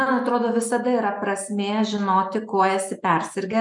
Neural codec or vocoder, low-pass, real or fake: none; 10.8 kHz; real